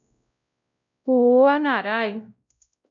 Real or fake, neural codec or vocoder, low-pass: fake; codec, 16 kHz, 0.5 kbps, X-Codec, WavLM features, trained on Multilingual LibriSpeech; 7.2 kHz